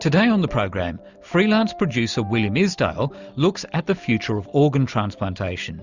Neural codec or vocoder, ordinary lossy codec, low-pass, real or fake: none; Opus, 64 kbps; 7.2 kHz; real